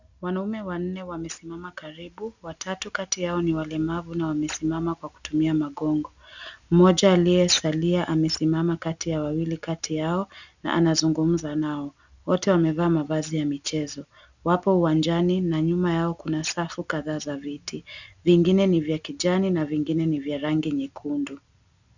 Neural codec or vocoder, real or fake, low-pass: none; real; 7.2 kHz